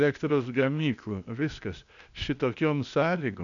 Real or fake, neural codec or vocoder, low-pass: fake; codec, 16 kHz, 0.8 kbps, ZipCodec; 7.2 kHz